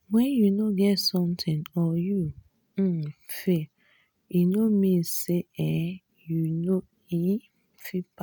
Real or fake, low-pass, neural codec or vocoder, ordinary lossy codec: real; none; none; none